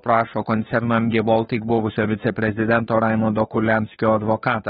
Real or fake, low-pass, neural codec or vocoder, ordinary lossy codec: fake; 7.2 kHz; codec, 16 kHz, 2 kbps, FunCodec, trained on Chinese and English, 25 frames a second; AAC, 16 kbps